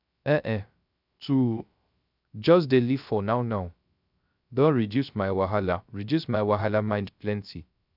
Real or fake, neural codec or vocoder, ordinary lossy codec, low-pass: fake; codec, 16 kHz, 0.3 kbps, FocalCodec; none; 5.4 kHz